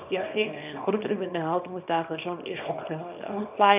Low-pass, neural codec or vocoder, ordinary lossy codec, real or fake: 3.6 kHz; codec, 24 kHz, 0.9 kbps, WavTokenizer, small release; none; fake